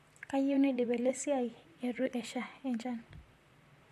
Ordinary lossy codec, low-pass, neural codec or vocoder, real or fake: MP3, 64 kbps; 14.4 kHz; vocoder, 44.1 kHz, 128 mel bands, Pupu-Vocoder; fake